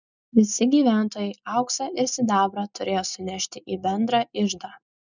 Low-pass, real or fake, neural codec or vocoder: 7.2 kHz; real; none